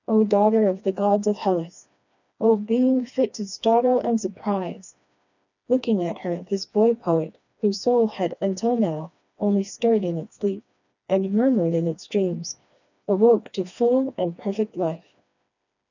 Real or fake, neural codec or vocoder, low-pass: fake; codec, 16 kHz, 2 kbps, FreqCodec, smaller model; 7.2 kHz